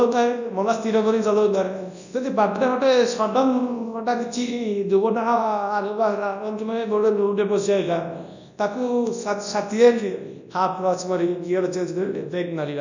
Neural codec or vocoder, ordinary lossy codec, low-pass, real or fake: codec, 24 kHz, 0.9 kbps, WavTokenizer, large speech release; none; 7.2 kHz; fake